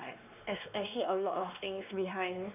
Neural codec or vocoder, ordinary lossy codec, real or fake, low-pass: codec, 16 kHz, 2 kbps, X-Codec, WavLM features, trained on Multilingual LibriSpeech; none; fake; 3.6 kHz